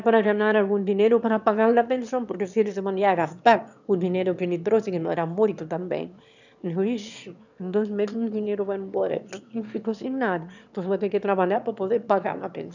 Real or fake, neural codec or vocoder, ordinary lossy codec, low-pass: fake; autoencoder, 22.05 kHz, a latent of 192 numbers a frame, VITS, trained on one speaker; none; 7.2 kHz